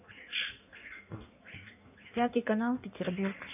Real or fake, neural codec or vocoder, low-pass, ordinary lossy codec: fake; codec, 16 kHz in and 24 kHz out, 1.1 kbps, FireRedTTS-2 codec; 3.6 kHz; none